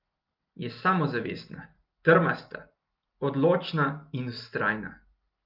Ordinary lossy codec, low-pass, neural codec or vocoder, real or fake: Opus, 32 kbps; 5.4 kHz; none; real